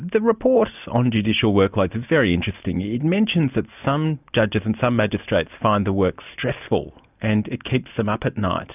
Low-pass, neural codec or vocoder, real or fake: 3.6 kHz; none; real